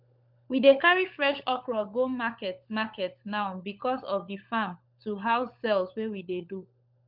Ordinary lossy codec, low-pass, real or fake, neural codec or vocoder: MP3, 48 kbps; 5.4 kHz; fake; codec, 16 kHz, 16 kbps, FunCodec, trained on LibriTTS, 50 frames a second